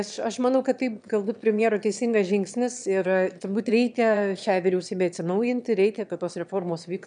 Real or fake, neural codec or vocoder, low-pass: fake; autoencoder, 22.05 kHz, a latent of 192 numbers a frame, VITS, trained on one speaker; 9.9 kHz